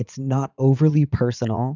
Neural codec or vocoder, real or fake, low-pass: vocoder, 44.1 kHz, 80 mel bands, Vocos; fake; 7.2 kHz